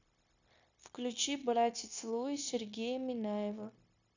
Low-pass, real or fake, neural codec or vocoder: 7.2 kHz; fake; codec, 16 kHz, 0.9 kbps, LongCat-Audio-Codec